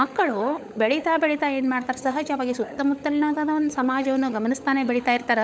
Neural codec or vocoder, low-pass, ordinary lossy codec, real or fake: codec, 16 kHz, 16 kbps, FunCodec, trained on LibriTTS, 50 frames a second; none; none; fake